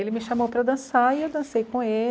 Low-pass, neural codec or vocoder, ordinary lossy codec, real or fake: none; none; none; real